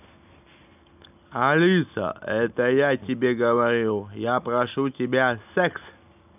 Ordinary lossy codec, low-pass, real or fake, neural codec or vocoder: none; 3.6 kHz; real; none